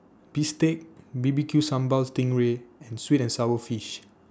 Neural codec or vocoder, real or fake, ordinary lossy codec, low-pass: none; real; none; none